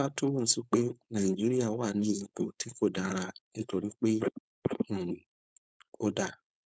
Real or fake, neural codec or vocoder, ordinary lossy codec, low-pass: fake; codec, 16 kHz, 4.8 kbps, FACodec; none; none